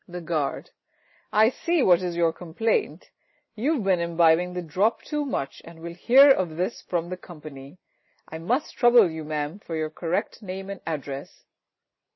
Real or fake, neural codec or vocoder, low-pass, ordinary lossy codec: real; none; 7.2 kHz; MP3, 24 kbps